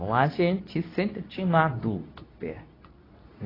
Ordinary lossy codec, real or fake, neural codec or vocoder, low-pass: AAC, 24 kbps; fake; codec, 24 kHz, 0.9 kbps, WavTokenizer, medium speech release version 2; 5.4 kHz